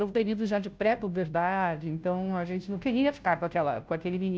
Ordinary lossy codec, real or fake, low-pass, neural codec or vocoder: none; fake; none; codec, 16 kHz, 0.5 kbps, FunCodec, trained on Chinese and English, 25 frames a second